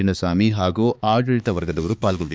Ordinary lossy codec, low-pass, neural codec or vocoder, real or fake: none; none; codec, 16 kHz, 4 kbps, X-Codec, HuBERT features, trained on balanced general audio; fake